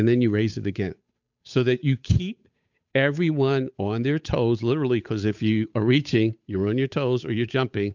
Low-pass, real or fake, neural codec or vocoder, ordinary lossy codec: 7.2 kHz; fake; codec, 16 kHz, 8 kbps, FunCodec, trained on Chinese and English, 25 frames a second; MP3, 64 kbps